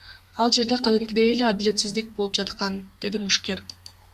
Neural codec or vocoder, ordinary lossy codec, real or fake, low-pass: codec, 32 kHz, 1.9 kbps, SNAC; Opus, 64 kbps; fake; 14.4 kHz